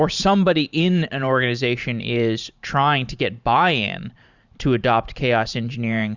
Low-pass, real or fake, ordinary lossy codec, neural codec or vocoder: 7.2 kHz; fake; Opus, 64 kbps; vocoder, 44.1 kHz, 128 mel bands every 512 samples, BigVGAN v2